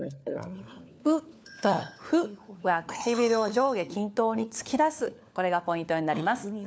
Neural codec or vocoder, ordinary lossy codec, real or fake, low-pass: codec, 16 kHz, 4 kbps, FunCodec, trained on LibriTTS, 50 frames a second; none; fake; none